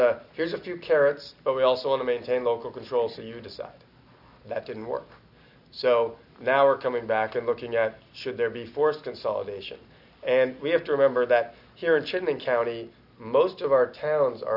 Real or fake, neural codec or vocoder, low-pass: real; none; 5.4 kHz